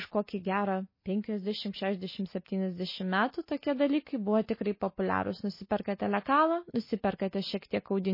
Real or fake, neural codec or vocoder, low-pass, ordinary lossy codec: real; none; 5.4 kHz; MP3, 24 kbps